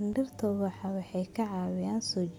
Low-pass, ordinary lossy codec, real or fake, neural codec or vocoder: 19.8 kHz; none; real; none